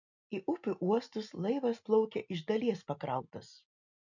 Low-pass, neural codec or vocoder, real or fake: 7.2 kHz; none; real